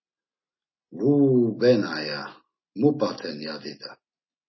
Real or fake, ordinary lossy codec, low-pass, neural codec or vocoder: real; MP3, 24 kbps; 7.2 kHz; none